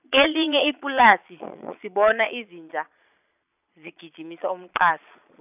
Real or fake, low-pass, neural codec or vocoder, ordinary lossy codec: fake; 3.6 kHz; vocoder, 22.05 kHz, 80 mel bands, Vocos; none